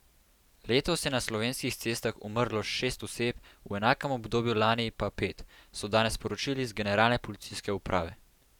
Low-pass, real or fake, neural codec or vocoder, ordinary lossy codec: 19.8 kHz; fake; vocoder, 44.1 kHz, 128 mel bands every 512 samples, BigVGAN v2; none